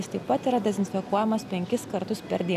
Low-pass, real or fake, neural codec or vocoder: 14.4 kHz; real; none